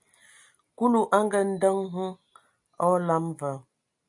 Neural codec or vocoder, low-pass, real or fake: none; 10.8 kHz; real